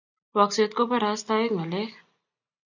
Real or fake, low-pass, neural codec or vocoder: real; 7.2 kHz; none